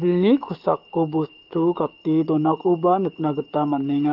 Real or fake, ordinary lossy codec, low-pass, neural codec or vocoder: fake; Opus, 24 kbps; 5.4 kHz; codec, 16 kHz, 6 kbps, DAC